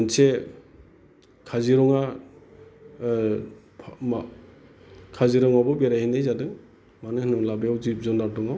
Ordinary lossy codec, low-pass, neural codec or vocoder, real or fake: none; none; none; real